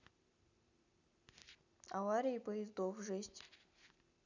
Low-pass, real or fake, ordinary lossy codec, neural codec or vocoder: 7.2 kHz; real; none; none